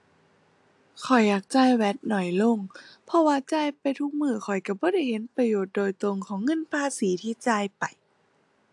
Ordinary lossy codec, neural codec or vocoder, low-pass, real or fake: AAC, 64 kbps; none; 10.8 kHz; real